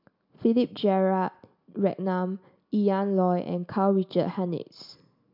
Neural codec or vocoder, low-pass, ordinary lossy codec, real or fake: none; 5.4 kHz; MP3, 48 kbps; real